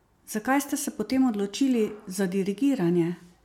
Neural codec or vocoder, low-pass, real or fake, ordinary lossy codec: none; 19.8 kHz; real; MP3, 96 kbps